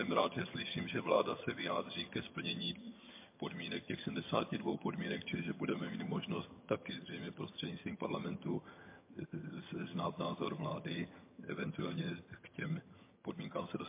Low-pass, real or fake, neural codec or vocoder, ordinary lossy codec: 3.6 kHz; fake; vocoder, 22.05 kHz, 80 mel bands, HiFi-GAN; MP3, 24 kbps